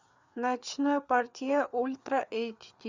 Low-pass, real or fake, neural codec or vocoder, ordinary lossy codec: 7.2 kHz; fake; codec, 16 kHz, 4 kbps, FreqCodec, larger model; Opus, 64 kbps